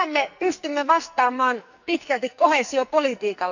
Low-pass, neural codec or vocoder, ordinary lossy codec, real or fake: 7.2 kHz; codec, 44.1 kHz, 2.6 kbps, SNAC; none; fake